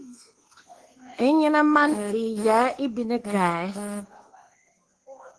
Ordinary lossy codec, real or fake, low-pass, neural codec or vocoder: Opus, 16 kbps; fake; 10.8 kHz; codec, 24 kHz, 1.2 kbps, DualCodec